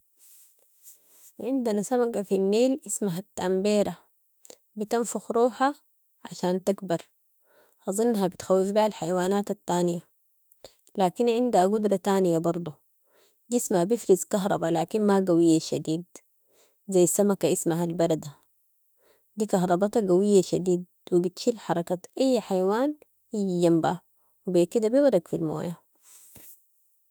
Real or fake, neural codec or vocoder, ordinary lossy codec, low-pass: fake; autoencoder, 48 kHz, 32 numbers a frame, DAC-VAE, trained on Japanese speech; none; none